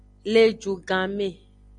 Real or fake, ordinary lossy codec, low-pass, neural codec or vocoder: real; MP3, 96 kbps; 9.9 kHz; none